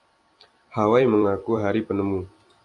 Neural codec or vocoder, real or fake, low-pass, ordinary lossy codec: vocoder, 44.1 kHz, 128 mel bands every 256 samples, BigVGAN v2; fake; 10.8 kHz; AAC, 64 kbps